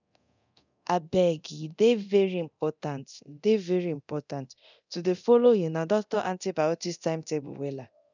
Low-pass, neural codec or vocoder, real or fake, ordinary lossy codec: 7.2 kHz; codec, 24 kHz, 0.9 kbps, DualCodec; fake; none